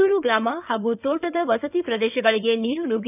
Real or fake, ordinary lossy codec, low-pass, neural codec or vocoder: fake; none; 3.6 kHz; codec, 16 kHz in and 24 kHz out, 2.2 kbps, FireRedTTS-2 codec